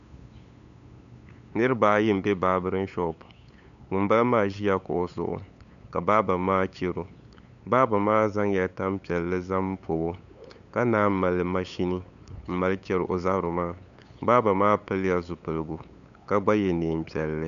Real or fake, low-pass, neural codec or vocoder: fake; 7.2 kHz; codec, 16 kHz, 8 kbps, FunCodec, trained on LibriTTS, 25 frames a second